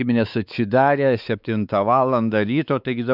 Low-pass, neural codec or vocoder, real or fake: 5.4 kHz; codec, 16 kHz, 4 kbps, X-Codec, WavLM features, trained on Multilingual LibriSpeech; fake